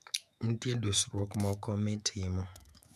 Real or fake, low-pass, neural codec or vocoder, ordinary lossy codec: fake; 14.4 kHz; autoencoder, 48 kHz, 128 numbers a frame, DAC-VAE, trained on Japanese speech; none